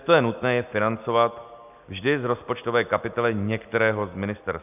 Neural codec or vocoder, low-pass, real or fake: none; 3.6 kHz; real